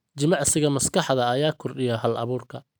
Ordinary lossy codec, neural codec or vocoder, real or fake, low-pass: none; none; real; none